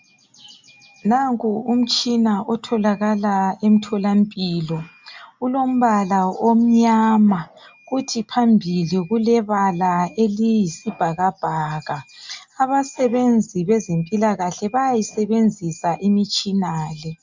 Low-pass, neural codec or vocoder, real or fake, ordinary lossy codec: 7.2 kHz; none; real; MP3, 64 kbps